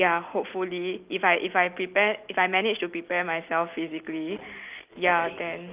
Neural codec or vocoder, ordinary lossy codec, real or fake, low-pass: none; Opus, 24 kbps; real; 3.6 kHz